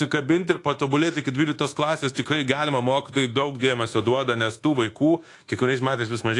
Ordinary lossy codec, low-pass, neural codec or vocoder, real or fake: AAC, 48 kbps; 10.8 kHz; codec, 24 kHz, 1.2 kbps, DualCodec; fake